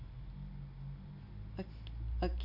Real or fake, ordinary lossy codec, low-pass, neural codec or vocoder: real; none; 5.4 kHz; none